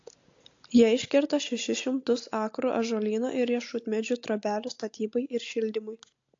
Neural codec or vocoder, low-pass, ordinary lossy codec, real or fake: none; 7.2 kHz; AAC, 48 kbps; real